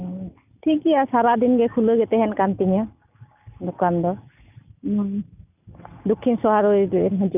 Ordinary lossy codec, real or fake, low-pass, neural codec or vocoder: none; real; 3.6 kHz; none